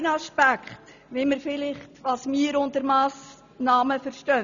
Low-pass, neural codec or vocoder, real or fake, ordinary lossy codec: 7.2 kHz; none; real; none